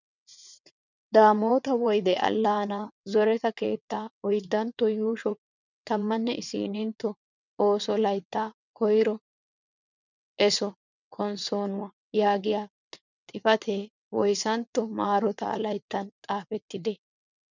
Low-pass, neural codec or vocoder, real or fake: 7.2 kHz; vocoder, 22.05 kHz, 80 mel bands, WaveNeXt; fake